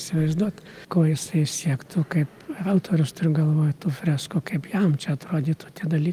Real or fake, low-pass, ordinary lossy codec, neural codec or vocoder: real; 14.4 kHz; Opus, 32 kbps; none